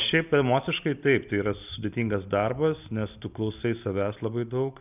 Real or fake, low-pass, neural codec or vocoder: real; 3.6 kHz; none